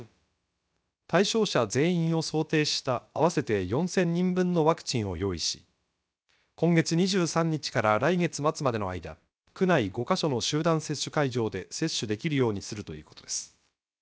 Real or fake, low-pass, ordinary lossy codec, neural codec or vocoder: fake; none; none; codec, 16 kHz, about 1 kbps, DyCAST, with the encoder's durations